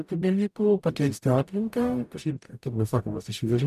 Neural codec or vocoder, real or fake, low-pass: codec, 44.1 kHz, 0.9 kbps, DAC; fake; 14.4 kHz